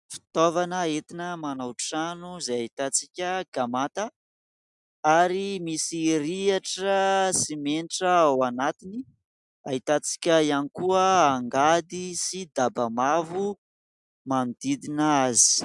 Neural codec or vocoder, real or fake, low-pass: none; real; 10.8 kHz